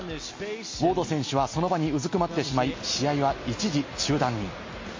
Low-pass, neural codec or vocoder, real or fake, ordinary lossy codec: 7.2 kHz; none; real; MP3, 32 kbps